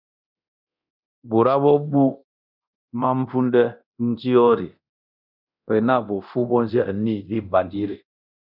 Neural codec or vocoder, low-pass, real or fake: codec, 24 kHz, 0.9 kbps, DualCodec; 5.4 kHz; fake